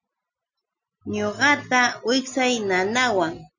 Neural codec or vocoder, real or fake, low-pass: none; real; 7.2 kHz